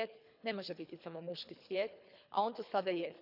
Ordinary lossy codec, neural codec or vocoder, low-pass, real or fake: none; codec, 24 kHz, 3 kbps, HILCodec; 5.4 kHz; fake